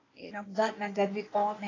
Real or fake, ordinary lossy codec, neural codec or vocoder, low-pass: fake; AAC, 32 kbps; codec, 16 kHz, 0.8 kbps, ZipCodec; 7.2 kHz